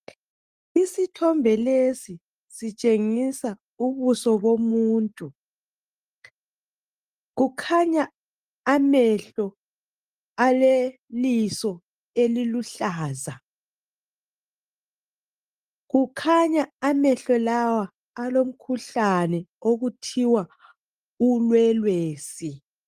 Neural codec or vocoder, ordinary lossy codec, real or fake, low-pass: none; Opus, 32 kbps; real; 14.4 kHz